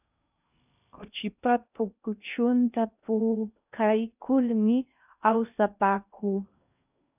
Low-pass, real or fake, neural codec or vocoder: 3.6 kHz; fake; codec, 16 kHz in and 24 kHz out, 0.6 kbps, FocalCodec, streaming, 2048 codes